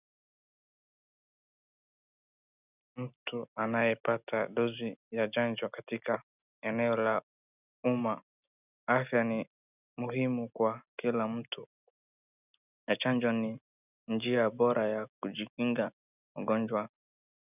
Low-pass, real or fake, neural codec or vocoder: 3.6 kHz; real; none